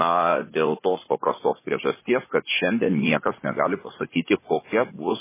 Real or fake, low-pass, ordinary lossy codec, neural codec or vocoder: fake; 3.6 kHz; MP3, 16 kbps; vocoder, 44.1 kHz, 80 mel bands, Vocos